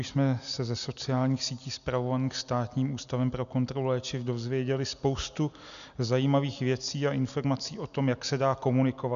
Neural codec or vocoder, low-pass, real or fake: none; 7.2 kHz; real